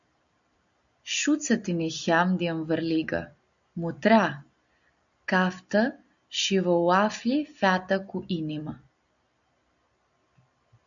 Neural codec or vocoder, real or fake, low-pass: none; real; 7.2 kHz